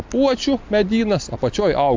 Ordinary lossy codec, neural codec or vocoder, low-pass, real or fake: MP3, 64 kbps; none; 7.2 kHz; real